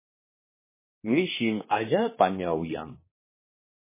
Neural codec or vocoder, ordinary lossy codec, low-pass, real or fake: codec, 16 kHz, 2 kbps, X-Codec, HuBERT features, trained on general audio; MP3, 16 kbps; 3.6 kHz; fake